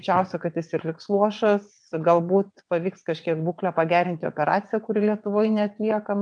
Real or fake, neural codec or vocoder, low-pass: fake; vocoder, 22.05 kHz, 80 mel bands, WaveNeXt; 9.9 kHz